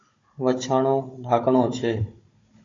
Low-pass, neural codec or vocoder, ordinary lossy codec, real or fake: 7.2 kHz; codec, 16 kHz, 16 kbps, FunCodec, trained on Chinese and English, 50 frames a second; AAC, 32 kbps; fake